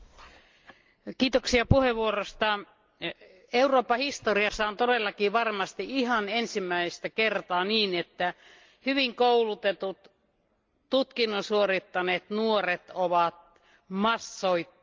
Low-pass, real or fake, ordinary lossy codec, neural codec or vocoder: 7.2 kHz; real; Opus, 32 kbps; none